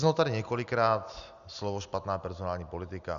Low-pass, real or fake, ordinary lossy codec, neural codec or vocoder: 7.2 kHz; real; MP3, 96 kbps; none